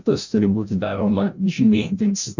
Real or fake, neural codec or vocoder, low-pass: fake; codec, 16 kHz, 0.5 kbps, FreqCodec, larger model; 7.2 kHz